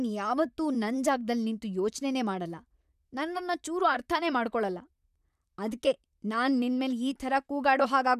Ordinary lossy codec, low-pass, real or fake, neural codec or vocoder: none; 14.4 kHz; fake; vocoder, 44.1 kHz, 128 mel bands, Pupu-Vocoder